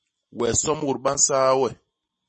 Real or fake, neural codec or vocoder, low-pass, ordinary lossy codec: real; none; 10.8 kHz; MP3, 32 kbps